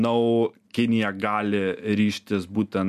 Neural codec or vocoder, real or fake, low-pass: none; real; 14.4 kHz